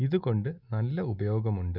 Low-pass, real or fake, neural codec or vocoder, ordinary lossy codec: 5.4 kHz; real; none; none